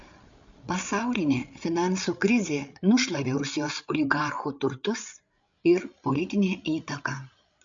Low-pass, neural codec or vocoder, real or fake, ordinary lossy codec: 7.2 kHz; codec, 16 kHz, 8 kbps, FreqCodec, larger model; fake; MP3, 64 kbps